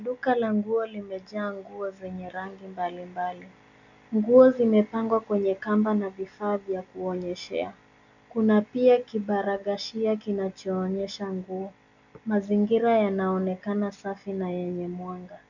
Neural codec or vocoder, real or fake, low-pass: none; real; 7.2 kHz